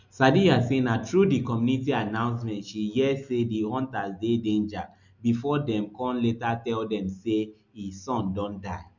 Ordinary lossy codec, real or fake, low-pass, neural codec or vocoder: none; real; 7.2 kHz; none